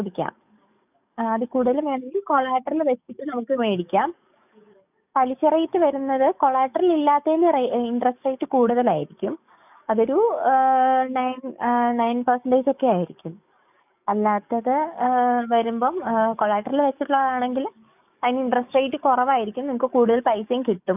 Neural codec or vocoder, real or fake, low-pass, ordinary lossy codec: codec, 44.1 kHz, 7.8 kbps, DAC; fake; 3.6 kHz; none